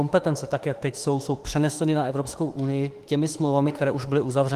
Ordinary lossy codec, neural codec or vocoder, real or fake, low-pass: Opus, 24 kbps; autoencoder, 48 kHz, 32 numbers a frame, DAC-VAE, trained on Japanese speech; fake; 14.4 kHz